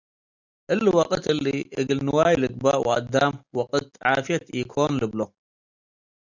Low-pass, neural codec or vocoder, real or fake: 7.2 kHz; none; real